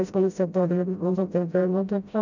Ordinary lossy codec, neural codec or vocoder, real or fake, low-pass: none; codec, 16 kHz, 0.5 kbps, FreqCodec, smaller model; fake; 7.2 kHz